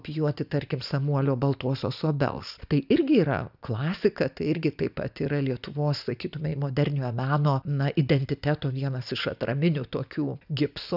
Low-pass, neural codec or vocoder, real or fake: 5.4 kHz; none; real